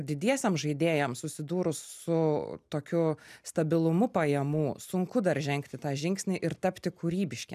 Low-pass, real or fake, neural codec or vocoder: 14.4 kHz; real; none